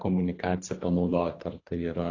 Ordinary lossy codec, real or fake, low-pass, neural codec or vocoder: AAC, 32 kbps; fake; 7.2 kHz; codec, 24 kHz, 3 kbps, HILCodec